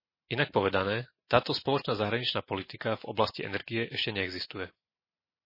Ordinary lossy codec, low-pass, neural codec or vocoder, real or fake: MP3, 24 kbps; 5.4 kHz; none; real